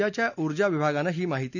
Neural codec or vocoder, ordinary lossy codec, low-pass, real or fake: none; none; 7.2 kHz; real